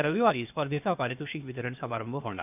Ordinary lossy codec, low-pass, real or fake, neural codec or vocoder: none; 3.6 kHz; fake; codec, 16 kHz, 0.8 kbps, ZipCodec